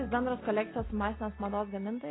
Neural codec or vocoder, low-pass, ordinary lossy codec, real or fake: none; 7.2 kHz; AAC, 16 kbps; real